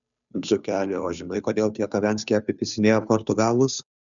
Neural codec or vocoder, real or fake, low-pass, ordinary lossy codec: codec, 16 kHz, 2 kbps, FunCodec, trained on Chinese and English, 25 frames a second; fake; 7.2 kHz; MP3, 96 kbps